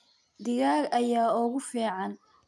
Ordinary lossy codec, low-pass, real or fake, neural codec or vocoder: none; none; real; none